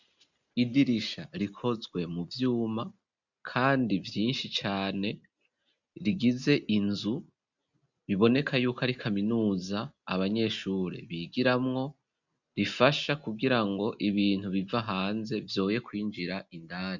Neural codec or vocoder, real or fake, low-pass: none; real; 7.2 kHz